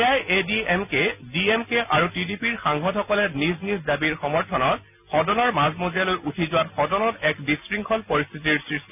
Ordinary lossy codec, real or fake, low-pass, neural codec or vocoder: none; real; 3.6 kHz; none